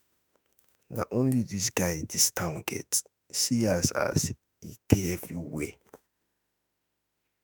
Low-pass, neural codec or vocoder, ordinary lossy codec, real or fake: none; autoencoder, 48 kHz, 32 numbers a frame, DAC-VAE, trained on Japanese speech; none; fake